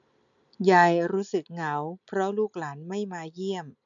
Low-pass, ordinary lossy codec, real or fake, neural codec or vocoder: 7.2 kHz; none; real; none